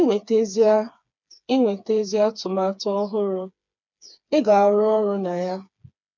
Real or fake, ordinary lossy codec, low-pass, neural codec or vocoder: fake; none; 7.2 kHz; codec, 16 kHz, 4 kbps, FreqCodec, smaller model